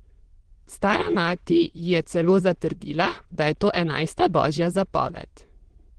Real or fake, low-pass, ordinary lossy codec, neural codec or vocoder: fake; 9.9 kHz; Opus, 16 kbps; autoencoder, 22.05 kHz, a latent of 192 numbers a frame, VITS, trained on many speakers